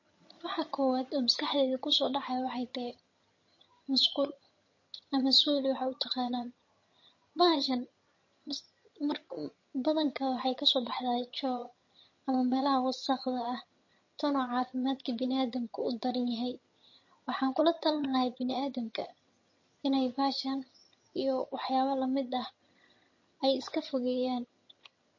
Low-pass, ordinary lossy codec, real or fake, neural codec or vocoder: 7.2 kHz; MP3, 32 kbps; fake; vocoder, 22.05 kHz, 80 mel bands, HiFi-GAN